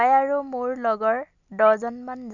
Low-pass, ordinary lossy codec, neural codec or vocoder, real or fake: 7.2 kHz; none; none; real